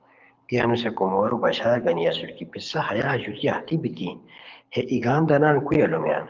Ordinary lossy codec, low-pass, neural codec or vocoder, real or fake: Opus, 32 kbps; 7.2 kHz; codec, 24 kHz, 6 kbps, HILCodec; fake